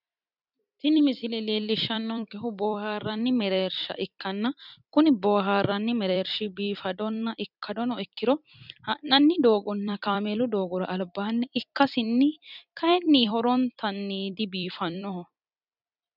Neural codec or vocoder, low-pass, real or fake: vocoder, 44.1 kHz, 128 mel bands every 256 samples, BigVGAN v2; 5.4 kHz; fake